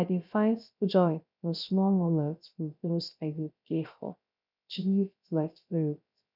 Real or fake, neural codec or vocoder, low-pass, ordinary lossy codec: fake; codec, 16 kHz, 0.3 kbps, FocalCodec; 5.4 kHz; none